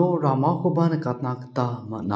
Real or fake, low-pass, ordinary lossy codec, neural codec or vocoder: real; none; none; none